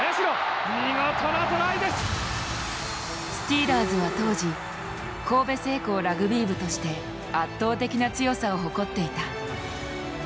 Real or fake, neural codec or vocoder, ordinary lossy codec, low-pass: real; none; none; none